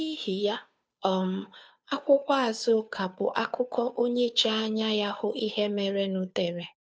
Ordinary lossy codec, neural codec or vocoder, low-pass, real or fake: none; codec, 16 kHz, 2 kbps, FunCodec, trained on Chinese and English, 25 frames a second; none; fake